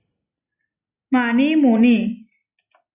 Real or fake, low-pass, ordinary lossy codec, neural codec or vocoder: real; 3.6 kHz; Opus, 64 kbps; none